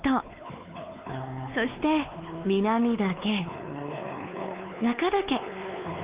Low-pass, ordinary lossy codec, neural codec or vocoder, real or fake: 3.6 kHz; Opus, 24 kbps; codec, 16 kHz, 4 kbps, X-Codec, WavLM features, trained on Multilingual LibriSpeech; fake